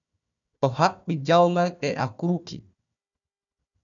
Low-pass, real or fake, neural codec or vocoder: 7.2 kHz; fake; codec, 16 kHz, 1 kbps, FunCodec, trained on Chinese and English, 50 frames a second